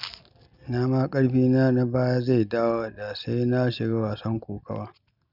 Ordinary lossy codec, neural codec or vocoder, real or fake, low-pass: none; none; real; 5.4 kHz